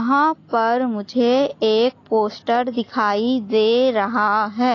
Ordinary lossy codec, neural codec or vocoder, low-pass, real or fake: AAC, 32 kbps; none; 7.2 kHz; real